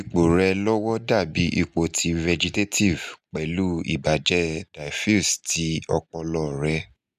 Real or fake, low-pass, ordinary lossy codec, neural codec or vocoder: real; 14.4 kHz; none; none